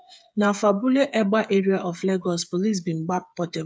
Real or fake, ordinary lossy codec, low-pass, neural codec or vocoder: fake; none; none; codec, 16 kHz, 8 kbps, FreqCodec, smaller model